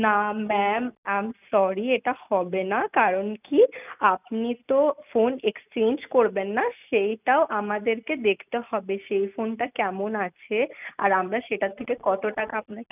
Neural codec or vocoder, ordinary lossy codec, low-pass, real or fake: none; none; 3.6 kHz; real